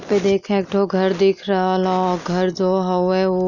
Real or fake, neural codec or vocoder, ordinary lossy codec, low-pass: real; none; none; 7.2 kHz